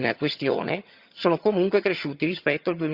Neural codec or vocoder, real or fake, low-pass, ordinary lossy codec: vocoder, 22.05 kHz, 80 mel bands, HiFi-GAN; fake; 5.4 kHz; Opus, 64 kbps